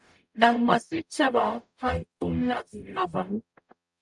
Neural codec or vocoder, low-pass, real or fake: codec, 44.1 kHz, 0.9 kbps, DAC; 10.8 kHz; fake